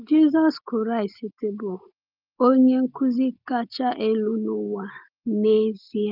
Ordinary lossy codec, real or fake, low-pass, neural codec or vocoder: Opus, 32 kbps; real; 5.4 kHz; none